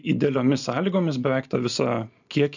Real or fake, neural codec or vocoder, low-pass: real; none; 7.2 kHz